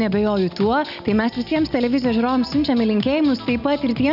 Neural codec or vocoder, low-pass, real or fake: none; 5.4 kHz; real